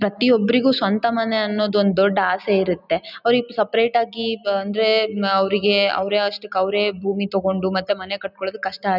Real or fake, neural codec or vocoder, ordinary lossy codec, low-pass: real; none; none; 5.4 kHz